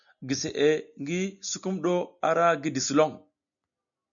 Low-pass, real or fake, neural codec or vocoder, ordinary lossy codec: 7.2 kHz; real; none; MP3, 96 kbps